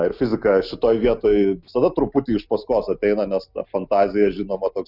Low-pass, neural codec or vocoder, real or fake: 5.4 kHz; none; real